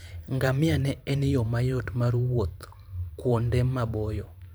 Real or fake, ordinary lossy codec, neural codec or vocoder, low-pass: fake; none; vocoder, 44.1 kHz, 128 mel bands every 512 samples, BigVGAN v2; none